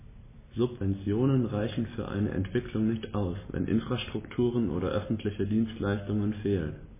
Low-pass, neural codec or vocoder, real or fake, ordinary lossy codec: 3.6 kHz; none; real; MP3, 16 kbps